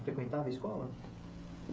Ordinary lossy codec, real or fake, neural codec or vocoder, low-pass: none; fake; codec, 16 kHz, 16 kbps, FreqCodec, smaller model; none